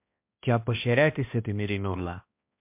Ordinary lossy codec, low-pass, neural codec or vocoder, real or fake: MP3, 32 kbps; 3.6 kHz; codec, 16 kHz, 1 kbps, X-Codec, HuBERT features, trained on balanced general audio; fake